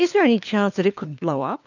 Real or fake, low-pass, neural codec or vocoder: fake; 7.2 kHz; codec, 16 kHz, 4 kbps, FunCodec, trained on LibriTTS, 50 frames a second